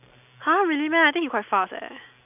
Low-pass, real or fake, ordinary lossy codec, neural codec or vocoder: 3.6 kHz; real; none; none